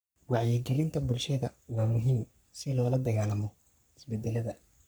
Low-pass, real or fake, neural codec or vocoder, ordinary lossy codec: none; fake; codec, 44.1 kHz, 3.4 kbps, Pupu-Codec; none